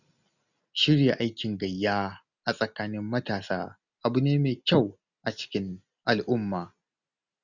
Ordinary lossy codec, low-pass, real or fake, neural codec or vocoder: none; 7.2 kHz; real; none